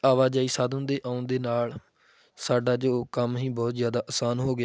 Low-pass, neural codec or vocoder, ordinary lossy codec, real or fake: none; none; none; real